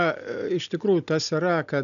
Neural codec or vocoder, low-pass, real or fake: none; 7.2 kHz; real